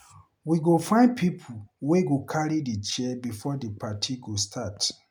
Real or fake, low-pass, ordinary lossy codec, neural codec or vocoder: real; none; none; none